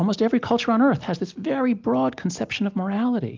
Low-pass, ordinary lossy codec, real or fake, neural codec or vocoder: 7.2 kHz; Opus, 24 kbps; real; none